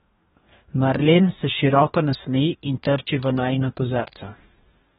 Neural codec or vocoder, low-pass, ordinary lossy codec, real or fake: codec, 44.1 kHz, 2.6 kbps, DAC; 19.8 kHz; AAC, 16 kbps; fake